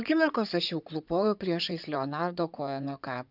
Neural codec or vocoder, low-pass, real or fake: codec, 16 kHz in and 24 kHz out, 2.2 kbps, FireRedTTS-2 codec; 5.4 kHz; fake